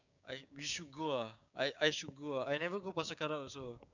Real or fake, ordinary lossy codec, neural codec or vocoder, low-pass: fake; none; codec, 16 kHz, 6 kbps, DAC; 7.2 kHz